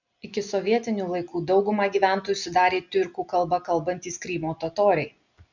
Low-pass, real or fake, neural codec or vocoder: 7.2 kHz; real; none